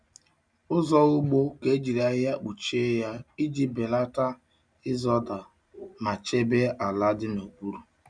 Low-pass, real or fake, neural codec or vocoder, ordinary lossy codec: 9.9 kHz; real; none; none